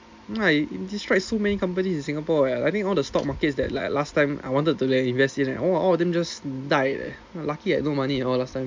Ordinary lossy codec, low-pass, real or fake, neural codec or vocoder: MP3, 64 kbps; 7.2 kHz; real; none